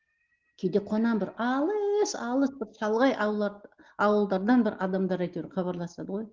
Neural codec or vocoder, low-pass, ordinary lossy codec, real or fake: none; 7.2 kHz; Opus, 16 kbps; real